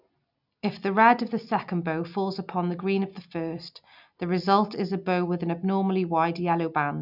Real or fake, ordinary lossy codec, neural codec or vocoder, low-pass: real; none; none; 5.4 kHz